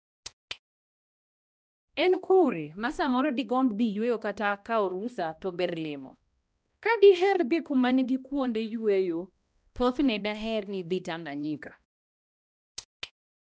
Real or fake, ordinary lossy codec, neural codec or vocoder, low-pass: fake; none; codec, 16 kHz, 1 kbps, X-Codec, HuBERT features, trained on balanced general audio; none